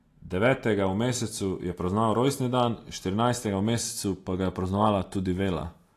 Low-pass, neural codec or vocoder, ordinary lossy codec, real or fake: 14.4 kHz; none; AAC, 48 kbps; real